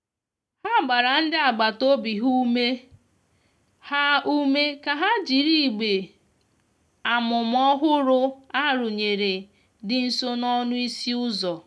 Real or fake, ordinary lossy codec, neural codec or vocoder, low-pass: real; none; none; none